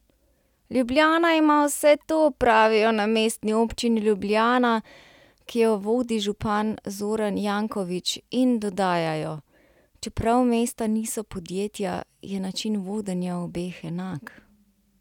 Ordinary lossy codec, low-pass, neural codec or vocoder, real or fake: none; 19.8 kHz; none; real